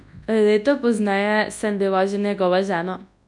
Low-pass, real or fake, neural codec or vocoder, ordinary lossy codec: 10.8 kHz; fake; codec, 24 kHz, 0.9 kbps, WavTokenizer, large speech release; none